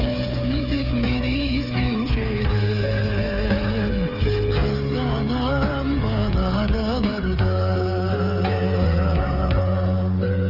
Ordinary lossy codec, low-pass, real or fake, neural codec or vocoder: Opus, 24 kbps; 5.4 kHz; fake; codec, 16 kHz, 8 kbps, FreqCodec, smaller model